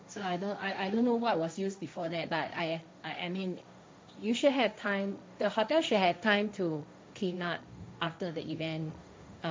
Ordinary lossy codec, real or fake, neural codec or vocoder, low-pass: none; fake; codec, 16 kHz, 1.1 kbps, Voila-Tokenizer; none